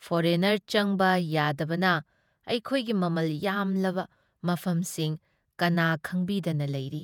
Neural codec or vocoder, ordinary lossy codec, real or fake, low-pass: vocoder, 48 kHz, 128 mel bands, Vocos; none; fake; 19.8 kHz